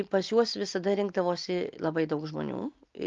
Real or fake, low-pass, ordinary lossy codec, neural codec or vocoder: real; 7.2 kHz; Opus, 32 kbps; none